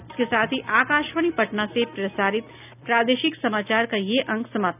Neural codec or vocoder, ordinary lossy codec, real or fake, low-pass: none; none; real; 3.6 kHz